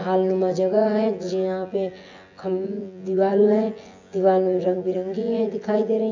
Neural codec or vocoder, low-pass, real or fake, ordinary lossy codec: vocoder, 24 kHz, 100 mel bands, Vocos; 7.2 kHz; fake; AAC, 48 kbps